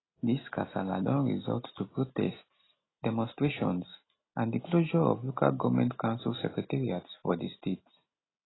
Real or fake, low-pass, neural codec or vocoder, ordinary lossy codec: real; 7.2 kHz; none; AAC, 16 kbps